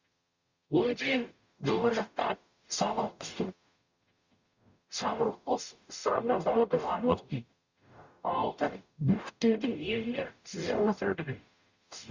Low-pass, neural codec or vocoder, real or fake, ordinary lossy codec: 7.2 kHz; codec, 44.1 kHz, 0.9 kbps, DAC; fake; Opus, 64 kbps